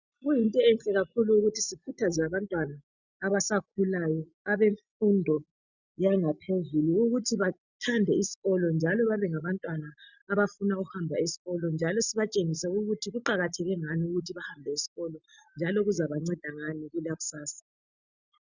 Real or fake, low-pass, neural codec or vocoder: real; 7.2 kHz; none